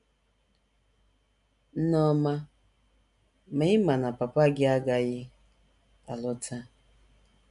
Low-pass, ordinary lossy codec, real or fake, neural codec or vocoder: 10.8 kHz; none; real; none